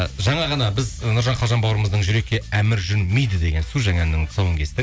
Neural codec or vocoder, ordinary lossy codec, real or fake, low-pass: none; none; real; none